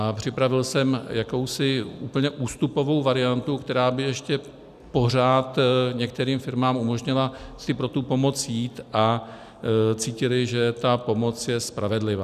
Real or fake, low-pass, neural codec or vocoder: real; 14.4 kHz; none